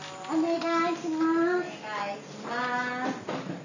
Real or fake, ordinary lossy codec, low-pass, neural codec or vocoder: real; none; 7.2 kHz; none